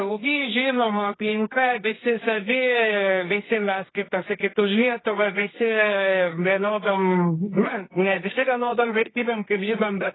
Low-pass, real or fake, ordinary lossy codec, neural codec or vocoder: 7.2 kHz; fake; AAC, 16 kbps; codec, 24 kHz, 0.9 kbps, WavTokenizer, medium music audio release